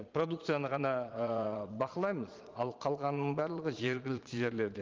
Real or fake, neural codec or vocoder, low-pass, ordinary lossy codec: fake; vocoder, 22.05 kHz, 80 mel bands, Vocos; 7.2 kHz; Opus, 32 kbps